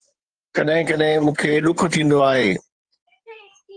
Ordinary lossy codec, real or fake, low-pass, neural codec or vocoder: Opus, 24 kbps; fake; 9.9 kHz; codec, 16 kHz in and 24 kHz out, 2.2 kbps, FireRedTTS-2 codec